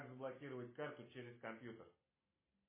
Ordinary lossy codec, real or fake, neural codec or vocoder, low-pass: MP3, 16 kbps; fake; vocoder, 44.1 kHz, 128 mel bands every 256 samples, BigVGAN v2; 3.6 kHz